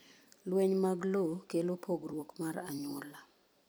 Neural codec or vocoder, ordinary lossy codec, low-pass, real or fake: vocoder, 44.1 kHz, 128 mel bands every 256 samples, BigVGAN v2; none; none; fake